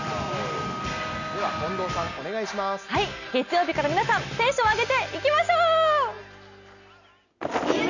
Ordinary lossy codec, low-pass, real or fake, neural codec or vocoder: none; 7.2 kHz; real; none